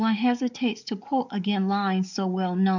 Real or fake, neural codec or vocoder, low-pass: fake; codec, 44.1 kHz, 7.8 kbps, DAC; 7.2 kHz